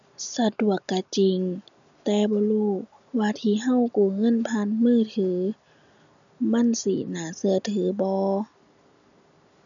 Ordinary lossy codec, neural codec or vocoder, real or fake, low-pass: none; none; real; 7.2 kHz